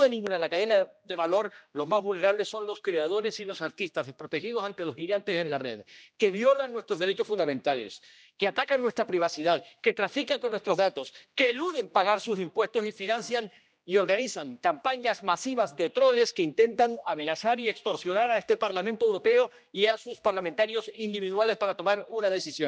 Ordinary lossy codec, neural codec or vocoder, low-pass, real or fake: none; codec, 16 kHz, 1 kbps, X-Codec, HuBERT features, trained on general audio; none; fake